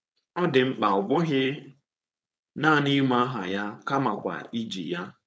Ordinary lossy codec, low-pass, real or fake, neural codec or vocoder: none; none; fake; codec, 16 kHz, 4.8 kbps, FACodec